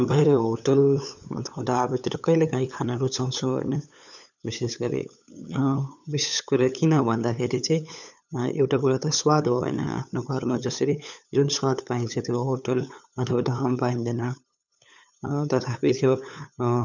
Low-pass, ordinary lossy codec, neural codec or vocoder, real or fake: 7.2 kHz; none; codec, 16 kHz, 8 kbps, FunCodec, trained on LibriTTS, 25 frames a second; fake